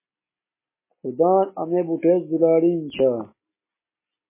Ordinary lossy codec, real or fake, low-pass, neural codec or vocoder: MP3, 16 kbps; real; 3.6 kHz; none